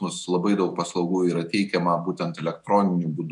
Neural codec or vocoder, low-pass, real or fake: autoencoder, 48 kHz, 128 numbers a frame, DAC-VAE, trained on Japanese speech; 10.8 kHz; fake